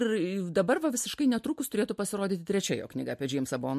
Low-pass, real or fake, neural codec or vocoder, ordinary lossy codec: 14.4 kHz; real; none; MP3, 64 kbps